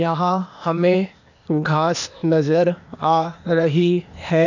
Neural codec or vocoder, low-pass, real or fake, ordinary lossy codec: codec, 16 kHz, 0.8 kbps, ZipCodec; 7.2 kHz; fake; none